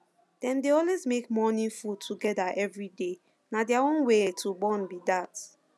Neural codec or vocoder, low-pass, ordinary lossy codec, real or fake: none; none; none; real